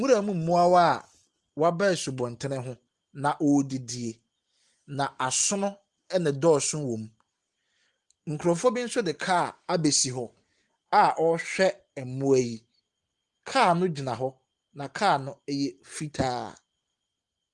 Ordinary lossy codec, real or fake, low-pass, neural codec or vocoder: Opus, 24 kbps; real; 10.8 kHz; none